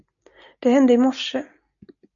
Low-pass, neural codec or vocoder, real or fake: 7.2 kHz; none; real